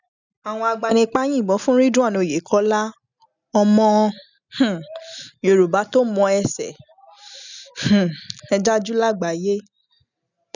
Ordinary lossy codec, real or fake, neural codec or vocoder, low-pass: none; real; none; 7.2 kHz